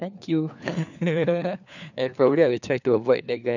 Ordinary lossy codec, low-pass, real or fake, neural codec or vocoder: none; 7.2 kHz; fake; codec, 16 kHz, 4 kbps, FunCodec, trained on LibriTTS, 50 frames a second